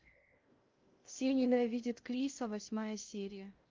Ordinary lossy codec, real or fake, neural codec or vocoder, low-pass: Opus, 32 kbps; fake; codec, 16 kHz in and 24 kHz out, 0.6 kbps, FocalCodec, streaming, 2048 codes; 7.2 kHz